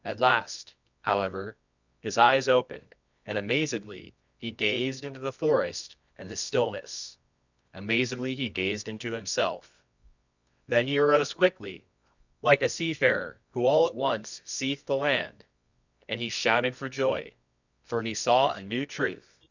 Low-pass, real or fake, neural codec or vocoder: 7.2 kHz; fake; codec, 24 kHz, 0.9 kbps, WavTokenizer, medium music audio release